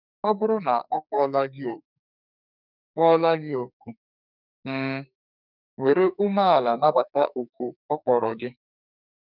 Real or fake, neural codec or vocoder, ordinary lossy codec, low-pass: fake; codec, 44.1 kHz, 2.6 kbps, SNAC; none; 5.4 kHz